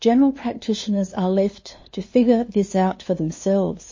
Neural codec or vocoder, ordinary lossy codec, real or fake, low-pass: codec, 16 kHz, 2 kbps, FunCodec, trained on LibriTTS, 25 frames a second; MP3, 32 kbps; fake; 7.2 kHz